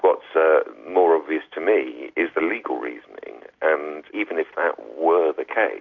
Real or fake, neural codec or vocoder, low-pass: real; none; 7.2 kHz